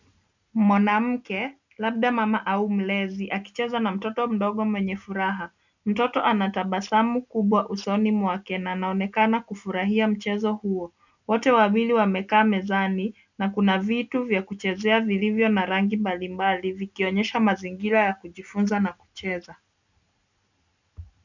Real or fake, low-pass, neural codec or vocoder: real; 7.2 kHz; none